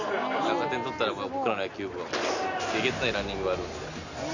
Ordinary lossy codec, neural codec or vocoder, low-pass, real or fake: none; none; 7.2 kHz; real